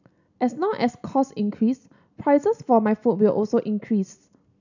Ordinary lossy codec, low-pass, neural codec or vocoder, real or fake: none; 7.2 kHz; none; real